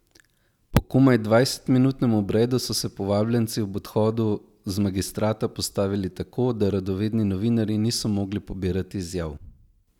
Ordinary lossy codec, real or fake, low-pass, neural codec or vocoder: none; real; 19.8 kHz; none